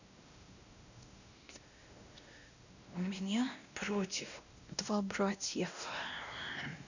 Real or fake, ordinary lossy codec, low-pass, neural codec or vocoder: fake; none; 7.2 kHz; codec, 16 kHz, 1 kbps, X-Codec, WavLM features, trained on Multilingual LibriSpeech